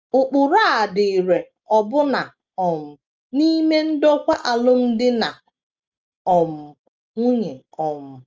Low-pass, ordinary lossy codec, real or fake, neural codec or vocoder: 7.2 kHz; Opus, 24 kbps; real; none